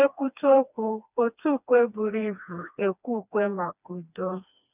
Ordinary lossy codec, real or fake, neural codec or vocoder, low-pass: none; fake; codec, 16 kHz, 2 kbps, FreqCodec, smaller model; 3.6 kHz